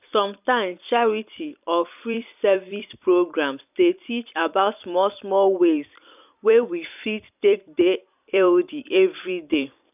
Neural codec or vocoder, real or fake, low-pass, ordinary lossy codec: vocoder, 44.1 kHz, 128 mel bands every 256 samples, BigVGAN v2; fake; 3.6 kHz; none